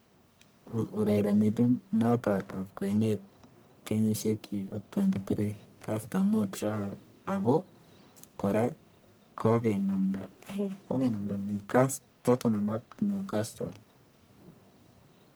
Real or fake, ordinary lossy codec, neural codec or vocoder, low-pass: fake; none; codec, 44.1 kHz, 1.7 kbps, Pupu-Codec; none